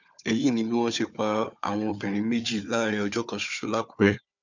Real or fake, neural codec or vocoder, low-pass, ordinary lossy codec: fake; codec, 16 kHz, 4 kbps, FunCodec, trained on Chinese and English, 50 frames a second; 7.2 kHz; none